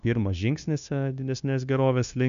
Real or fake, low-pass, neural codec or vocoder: fake; 7.2 kHz; codec, 16 kHz, 0.9 kbps, LongCat-Audio-Codec